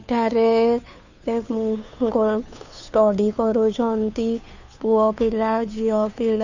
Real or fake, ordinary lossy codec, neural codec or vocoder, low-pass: fake; none; codec, 16 kHz, 2 kbps, FunCodec, trained on Chinese and English, 25 frames a second; 7.2 kHz